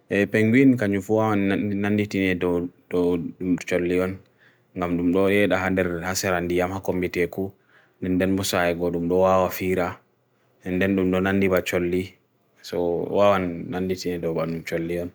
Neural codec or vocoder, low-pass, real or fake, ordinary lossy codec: none; none; real; none